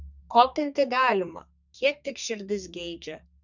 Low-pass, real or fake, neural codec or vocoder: 7.2 kHz; fake; codec, 32 kHz, 1.9 kbps, SNAC